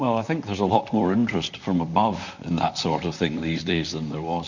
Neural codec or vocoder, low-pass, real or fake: vocoder, 44.1 kHz, 128 mel bands, Pupu-Vocoder; 7.2 kHz; fake